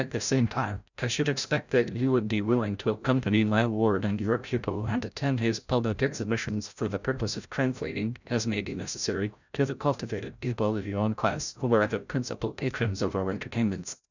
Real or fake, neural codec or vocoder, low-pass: fake; codec, 16 kHz, 0.5 kbps, FreqCodec, larger model; 7.2 kHz